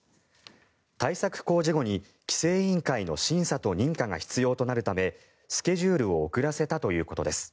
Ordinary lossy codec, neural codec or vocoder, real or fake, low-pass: none; none; real; none